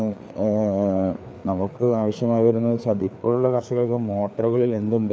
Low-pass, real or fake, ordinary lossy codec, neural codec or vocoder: none; fake; none; codec, 16 kHz, 4 kbps, FreqCodec, larger model